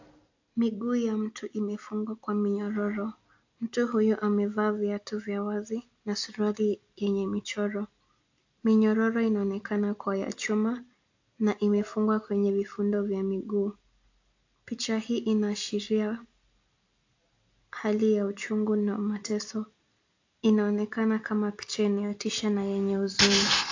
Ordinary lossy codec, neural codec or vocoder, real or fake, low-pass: AAC, 48 kbps; none; real; 7.2 kHz